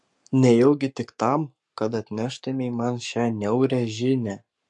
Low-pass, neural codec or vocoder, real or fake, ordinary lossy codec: 10.8 kHz; codec, 44.1 kHz, 7.8 kbps, Pupu-Codec; fake; MP3, 64 kbps